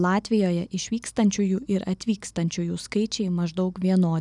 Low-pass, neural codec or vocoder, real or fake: 10.8 kHz; none; real